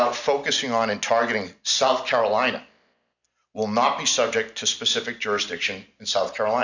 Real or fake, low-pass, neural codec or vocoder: real; 7.2 kHz; none